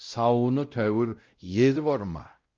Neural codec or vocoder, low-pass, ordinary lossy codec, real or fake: codec, 16 kHz, 0.5 kbps, X-Codec, WavLM features, trained on Multilingual LibriSpeech; 7.2 kHz; Opus, 24 kbps; fake